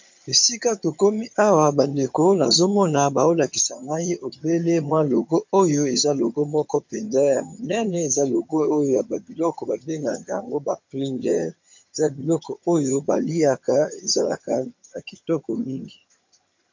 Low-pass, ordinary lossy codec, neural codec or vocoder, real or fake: 7.2 kHz; MP3, 48 kbps; vocoder, 22.05 kHz, 80 mel bands, HiFi-GAN; fake